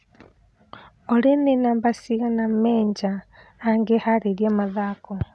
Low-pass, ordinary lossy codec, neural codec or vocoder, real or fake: none; none; none; real